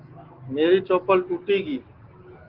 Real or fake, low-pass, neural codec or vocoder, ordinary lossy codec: real; 5.4 kHz; none; Opus, 16 kbps